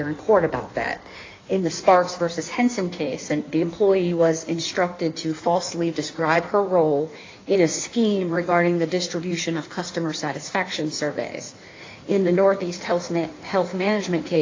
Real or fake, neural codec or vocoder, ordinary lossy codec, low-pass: fake; codec, 16 kHz in and 24 kHz out, 1.1 kbps, FireRedTTS-2 codec; AAC, 32 kbps; 7.2 kHz